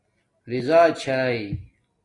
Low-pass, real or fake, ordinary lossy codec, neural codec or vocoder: 10.8 kHz; real; AAC, 32 kbps; none